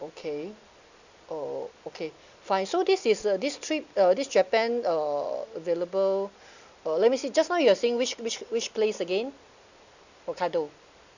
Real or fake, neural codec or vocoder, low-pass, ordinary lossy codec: real; none; 7.2 kHz; none